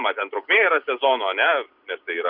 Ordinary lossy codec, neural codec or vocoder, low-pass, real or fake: Opus, 64 kbps; none; 5.4 kHz; real